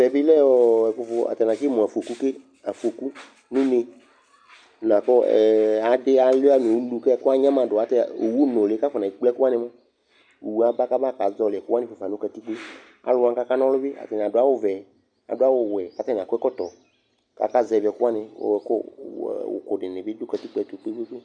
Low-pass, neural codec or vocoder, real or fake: 9.9 kHz; none; real